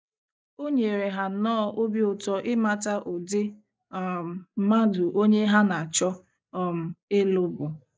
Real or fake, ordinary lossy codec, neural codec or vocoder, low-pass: real; none; none; none